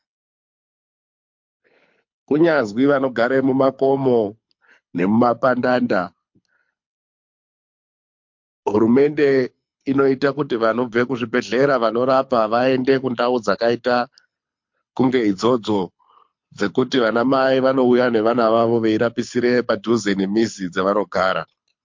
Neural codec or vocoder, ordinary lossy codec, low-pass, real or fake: codec, 24 kHz, 6 kbps, HILCodec; MP3, 48 kbps; 7.2 kHz; fake